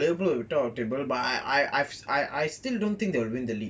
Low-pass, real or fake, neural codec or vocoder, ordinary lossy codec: none; real; none; none